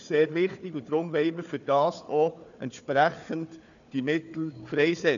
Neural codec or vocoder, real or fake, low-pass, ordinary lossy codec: codec, 16 kHz, 4 kbps, FunCodec, trained on Chinese and English, 50 frames a second; fake; 7.2 kHz; none